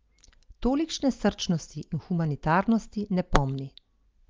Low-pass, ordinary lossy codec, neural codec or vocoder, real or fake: 7.2 kHz; Opus, 24 kbps; none; real